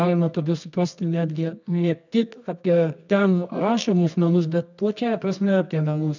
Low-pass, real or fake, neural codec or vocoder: 7.2 kHz; fake; codec, 24 kHz, 0.9 kbps, WavTokenizer, medium music audio release